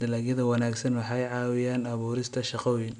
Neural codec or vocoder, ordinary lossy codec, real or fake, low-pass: none; none; real; 9.9 kHz